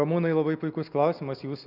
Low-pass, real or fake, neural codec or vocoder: 5.4 kHz; real; none